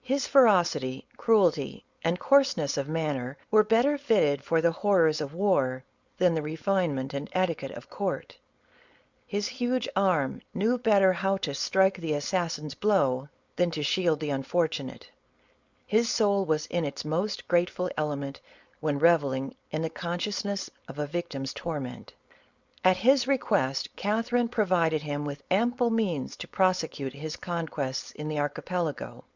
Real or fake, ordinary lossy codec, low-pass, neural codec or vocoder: fake; Opus, 64 kbps; 7.2 kHz; codec, 16 kHz, 4.8 kbps, FACodec